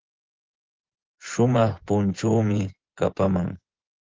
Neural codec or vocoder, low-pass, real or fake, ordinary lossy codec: vocoder, 24 kHz, 100 mel bands, Vocos; 7.2 kHz; fake; Opus, 16 kbps